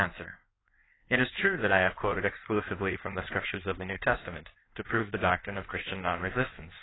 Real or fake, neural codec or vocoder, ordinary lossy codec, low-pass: fake; codec, 16 kHz in and 24 kHz out, 1.1 kbps, FireRedTTS-2 codec; AAC, 16 kbps; 7.2 kHz